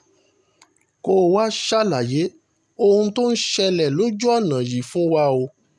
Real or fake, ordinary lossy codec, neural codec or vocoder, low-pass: real; none; none; none